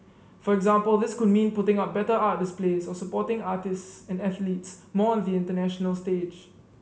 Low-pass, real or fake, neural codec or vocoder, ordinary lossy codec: none; real; none; none